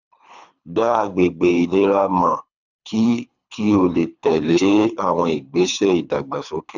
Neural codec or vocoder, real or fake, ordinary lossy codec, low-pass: codec, 24 kHz, 3 kbps, HILCodec; fake; none; 7.2 kHz